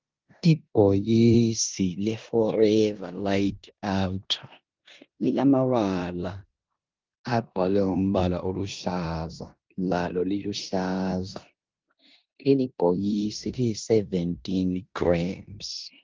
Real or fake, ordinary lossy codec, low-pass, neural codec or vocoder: fake; Opus, 32 kbps; 7.2 kHz; codec, 16 kHz in and 24 kHz out, 0.9 kbps, LongCat-Audio-Codec, four codebook decoder